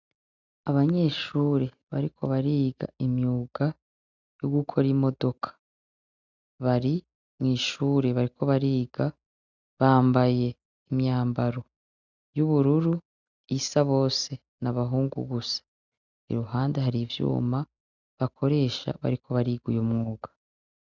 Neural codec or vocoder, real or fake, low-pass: none; real; 7.2 kHz